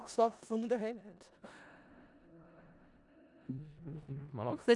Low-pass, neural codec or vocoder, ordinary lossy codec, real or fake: 10.8 kHz; codec, 16 kHz in and 24 kHz out, 0.4 kbps, LongCat-Audio-Codec, four codebook decoder; none; fake